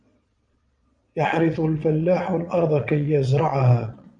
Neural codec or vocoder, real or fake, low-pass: vocoder, 22.05 kHz, 80 mel bands, Vocos; fake; 9.9 kHz